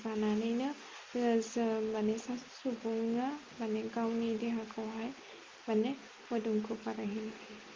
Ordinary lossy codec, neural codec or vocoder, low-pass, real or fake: Opus, 32 kbps; none; 7.2 kHz; real